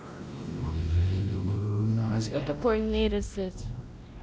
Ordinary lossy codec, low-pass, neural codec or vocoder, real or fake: none; none; codec, 16 kHz, 1 kbps, X-Codec, WavLM features, trained on Multilingual LibriSpeech; fake